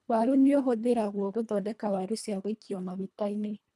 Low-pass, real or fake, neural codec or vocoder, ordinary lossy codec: none; fake; codec, 24 kHz, 1.5 kbps, HILCodec; none